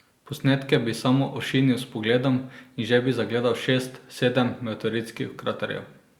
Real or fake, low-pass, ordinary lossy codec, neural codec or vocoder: real; 19.8 kHz; Opus, 64 kbps; none